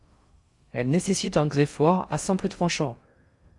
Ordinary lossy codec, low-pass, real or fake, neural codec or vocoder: Opus, 64 kbps; 10.8 kHz; fake; codec, 16 kHz in and 24 kHz out, 0.6 kbps, FocalCodec, streaming, 2048 codes